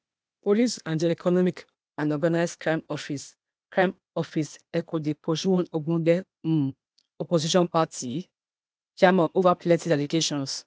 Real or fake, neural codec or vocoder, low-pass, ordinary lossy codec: fake; codec, 16 kHz, 0.8 kbps, ZipCodec; none; none